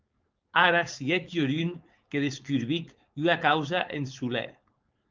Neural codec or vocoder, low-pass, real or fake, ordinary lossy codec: codec, 16 kHz, 4.8 kbps, FACodec; 7.2 kHz; fake; Opus, 24 kbps